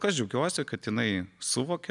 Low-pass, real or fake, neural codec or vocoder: 10.8 kHz; real; none